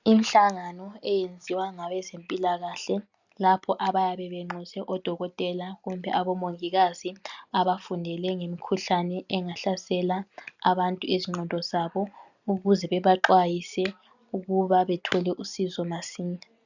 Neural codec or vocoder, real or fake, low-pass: none; real; 7.2 kHz